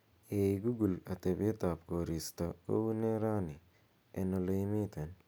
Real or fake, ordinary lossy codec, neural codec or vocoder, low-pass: real; none; none; none